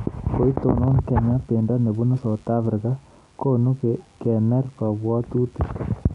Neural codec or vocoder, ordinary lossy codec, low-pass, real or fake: none; none; 10.8 kHz; real